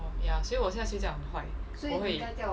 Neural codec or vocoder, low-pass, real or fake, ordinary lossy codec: none; none; real; none